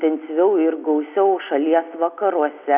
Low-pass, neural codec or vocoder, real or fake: 3.6 kHz; none; real